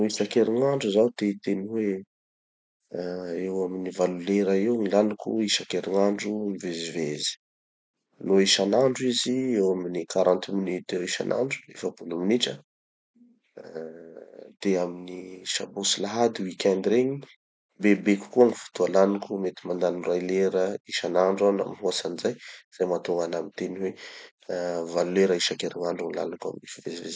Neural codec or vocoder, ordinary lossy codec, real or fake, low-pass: none; none; real; none